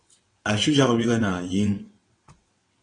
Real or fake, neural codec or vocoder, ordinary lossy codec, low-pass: fake; vocoder, 22.05 kHz, 80 mel bands, WaveNeXt; AAC, 32 kbps; 9.9 kHz